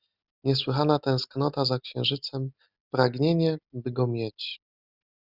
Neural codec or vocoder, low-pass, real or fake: none; 5.4 kHz; real